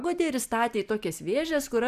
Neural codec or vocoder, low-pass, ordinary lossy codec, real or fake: none; 14.4 kHz; AAC, 96 kbps; real